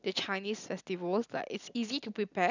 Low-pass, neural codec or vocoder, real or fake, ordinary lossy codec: 7.2 kHz; none; real; none